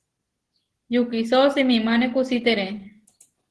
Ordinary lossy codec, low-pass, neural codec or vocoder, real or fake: Opus, 16 kbps; 10.8 kHz; none; real